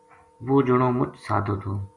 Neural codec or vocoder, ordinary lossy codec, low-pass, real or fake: none; Opus, 64 kbps; 10.8 kHz; real